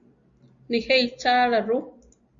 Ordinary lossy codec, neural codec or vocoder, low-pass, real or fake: Opus, 64 kbps; none; 7.2 kHz; real